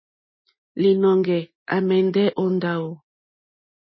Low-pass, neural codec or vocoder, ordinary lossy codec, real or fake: 7.2 kHz; none; MP3, 24 kbps; real